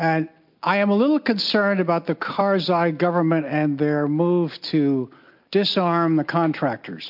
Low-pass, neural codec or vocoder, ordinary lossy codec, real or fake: 5.4 kHz; none; MP3, 48 kbps; real